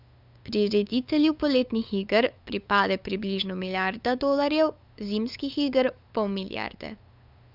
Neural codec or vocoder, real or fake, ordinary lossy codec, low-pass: codec, 16 kHz, 8 kbps, FunCodec, trained on LibriTTS, 25 frames a second; fake; none; 5.4 kHz